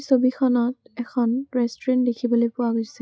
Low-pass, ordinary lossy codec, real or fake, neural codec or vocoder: none; none; real; none